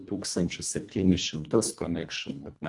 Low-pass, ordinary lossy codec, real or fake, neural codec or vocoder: 10.8 kHz; AAC, 64 kbps; fake; codec, 24 kHz, 1.5 kbps, HILCodec